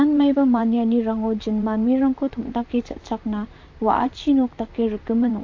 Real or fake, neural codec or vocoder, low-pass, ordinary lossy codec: fake; vocoder, 44.1 kHz, 128 mel bands, Pupu-Vocoder; 7.2 kHz; AAC, 48 kbps